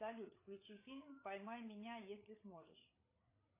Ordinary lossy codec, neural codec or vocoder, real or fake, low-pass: AAC, 24 kbps; codec, 16 kHz, 8 kbps, FreqCodec, larger model; fake; 3.6 kHz